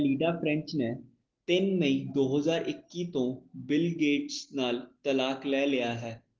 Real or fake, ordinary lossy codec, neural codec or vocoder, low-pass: real; Opus, 16 kbps; none; 7.2 kHz